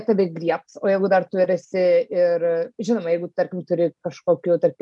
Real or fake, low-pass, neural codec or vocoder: real; 10.8 kHz; none